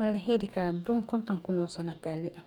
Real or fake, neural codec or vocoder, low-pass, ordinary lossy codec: fake; codec, 44.1 kHz, 2.6 kbps, DAC; 19.8 kHz; none